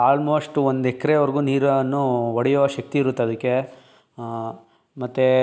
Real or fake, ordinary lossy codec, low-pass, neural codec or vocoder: real; none; none; none